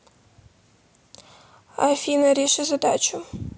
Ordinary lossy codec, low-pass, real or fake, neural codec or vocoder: none; none; real; none